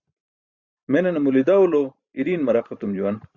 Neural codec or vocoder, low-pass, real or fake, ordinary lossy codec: vocoder, 44.1 kHz, 128 mel bands every 512 samples, BigVGAN v2; 7.2 kHz; fake; Opus, 64 kbps